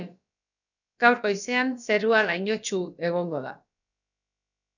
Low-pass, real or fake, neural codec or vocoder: 7.2 kHz; fake; codec, 16 kHz, about 1 kbps, DyCAST, with the encoder's durations